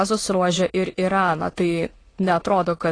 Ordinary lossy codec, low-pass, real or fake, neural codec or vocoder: AAC, 32 kbps; 9.9 kHz; fake; autoencoder, 22.05 kHz, a latent of 192 numbers a frame, VITS, trained on many speakers